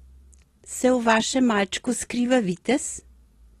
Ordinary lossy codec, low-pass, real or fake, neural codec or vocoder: AAC, 32 kbps; 19.8 kHz; real; none